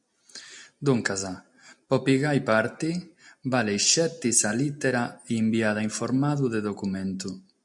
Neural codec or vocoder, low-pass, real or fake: none; 10.8 kHz; real